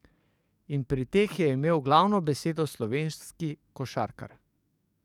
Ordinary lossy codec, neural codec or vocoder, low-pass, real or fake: none; codec, 44.1 kHz, 7.8 kbps, DAC; 19.8 kHz; fake